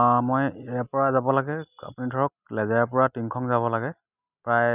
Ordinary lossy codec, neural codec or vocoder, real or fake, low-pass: none; none; real; 3.6 kHz